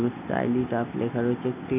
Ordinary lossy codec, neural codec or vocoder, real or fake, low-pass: none; none; real; 3.6 kHz